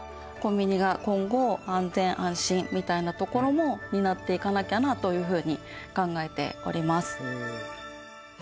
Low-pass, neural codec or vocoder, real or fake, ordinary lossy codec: none; none; real; none